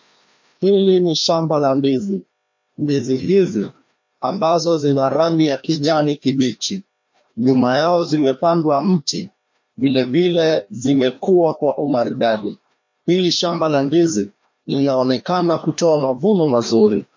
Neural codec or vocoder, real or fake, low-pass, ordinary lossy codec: codec, 16 kHz, 1 kbps, FreqCodec, larger model; fake; 7.2 kHz; MP3, 48 kbps